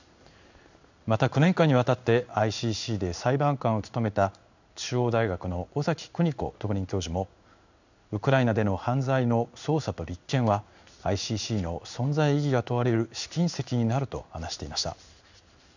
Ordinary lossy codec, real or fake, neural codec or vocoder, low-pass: none; fake; codec, 16 kHz in and 24 kHz out, 1 kbps, XY-Tokenizer; 7.2 kHz